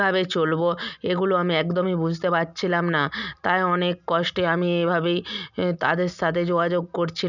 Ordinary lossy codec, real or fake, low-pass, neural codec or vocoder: none; real; 7.2 kHz; none